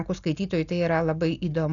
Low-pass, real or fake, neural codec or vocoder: 7.2 kHz; real; none